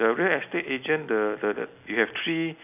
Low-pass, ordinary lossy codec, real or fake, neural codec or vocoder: 3.6 kHz; none; real; none